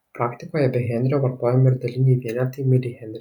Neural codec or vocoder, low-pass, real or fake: none; 19.8 kHz; real